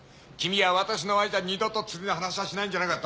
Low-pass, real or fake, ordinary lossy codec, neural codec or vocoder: none; real; none; none